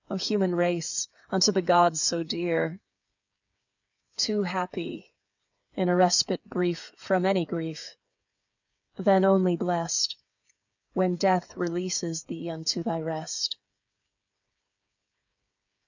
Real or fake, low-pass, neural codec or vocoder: fake; 7.2 kHz; codec, 16 kHz, 8 kbps, FreqCodec, smaller model